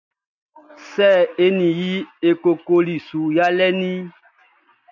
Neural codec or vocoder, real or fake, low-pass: none; real; 7.2 kHz